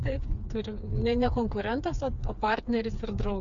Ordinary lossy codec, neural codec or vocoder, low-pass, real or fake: MP3, 96 kbps; codec, 16 kHz, 4 kbps, FreqCodec, smaller model; 7.2 kHz; fake